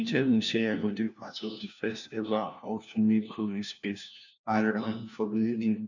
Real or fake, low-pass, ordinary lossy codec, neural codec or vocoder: fake; 7.2 kHz; none; codec, 16 kHz, 1 kbps, FunCodec, trained on LibriTTS, 50 frames a second